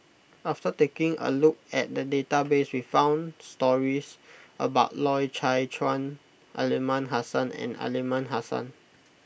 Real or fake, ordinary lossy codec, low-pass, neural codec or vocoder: real; none; none; none